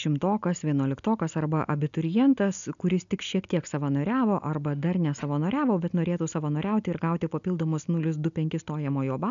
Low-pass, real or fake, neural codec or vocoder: 7.2 kHz; real; none